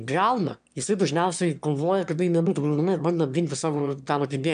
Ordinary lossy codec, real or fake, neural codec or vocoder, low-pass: AAC, 96 kbps; fake; autoencoder, 22.05 kHz, a latent of 192 numbers a frame, VITS, trained on one speaker; 9.9 kHz